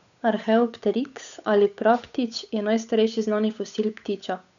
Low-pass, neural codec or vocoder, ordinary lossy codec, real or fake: 7.2 kHz; codec, 16 kHz, 8 kbps, FunCodec, trained on Chinese and English, 25 frames a second; none; fake